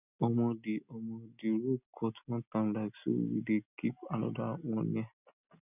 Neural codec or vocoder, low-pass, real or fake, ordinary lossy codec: none; 3.6 kHz; real; none